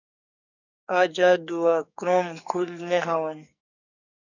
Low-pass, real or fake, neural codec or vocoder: 7.2 kHz; fake; codec, 44.1 kHz, 2.6 kbps, SNAC